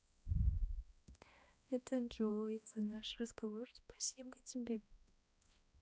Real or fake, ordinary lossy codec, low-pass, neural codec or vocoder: fake; none; none; codec, 16 kHz, 1 kbps, X-Codec, HuBERT features, trained on balanced general audio